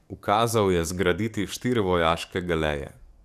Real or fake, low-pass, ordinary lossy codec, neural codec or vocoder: fake; 14.4 kHz; none; codec, 44.1 kHz, 7.8 kbps, DAC